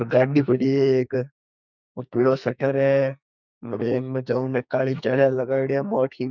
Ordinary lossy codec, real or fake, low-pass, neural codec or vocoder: none; fake; 7.2 kHz; codec, 44.1 kHz, 2.6 kbps, SNAC